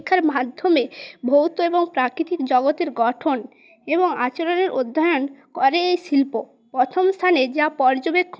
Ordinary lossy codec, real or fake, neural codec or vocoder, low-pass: none; real; none; none